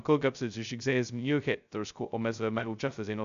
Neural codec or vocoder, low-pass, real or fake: codec, 16 kHz, 0.2 kbps, FocalCodec; 7.2 kHz; fake